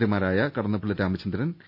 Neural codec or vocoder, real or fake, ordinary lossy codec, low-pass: none; real; none; 5.4 kHz